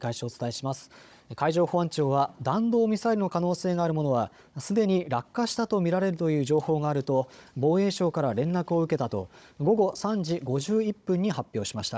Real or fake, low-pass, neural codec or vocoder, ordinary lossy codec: fake; none; codec, 16 kHz, 16 kbps, FunCodec, trained on Chinese and English, 50 frames a second; none